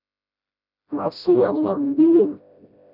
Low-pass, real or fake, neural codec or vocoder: 5.4 kHz; fake; codec, 16 kHz, 0.5 kbps, FreqCodec, smaller model